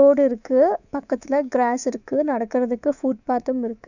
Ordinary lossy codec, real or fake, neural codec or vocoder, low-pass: none; fake; codec, 24 kHz, 3.1 kbps, DualCodec; 7.2 kHz